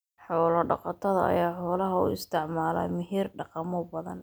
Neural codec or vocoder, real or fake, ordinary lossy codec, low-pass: none; real; none; none